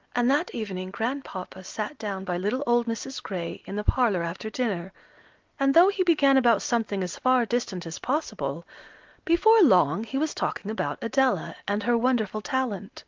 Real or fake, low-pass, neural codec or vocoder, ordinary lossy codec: real; 7.2 kHz; none; Opus, 32 kbps